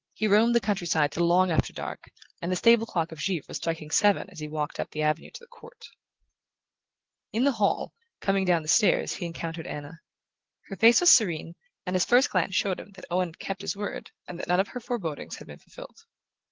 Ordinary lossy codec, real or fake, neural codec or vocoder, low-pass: Opus, 16 kbps; real; none; 7.2 kHz